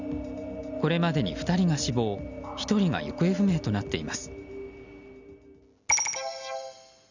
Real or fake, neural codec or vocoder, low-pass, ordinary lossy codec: real; none; 7.2 kHz; none